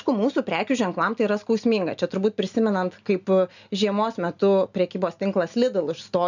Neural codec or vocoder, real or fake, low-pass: none; real; 7.2 kHz